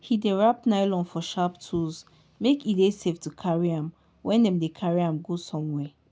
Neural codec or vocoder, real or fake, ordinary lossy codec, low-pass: none; real; none; none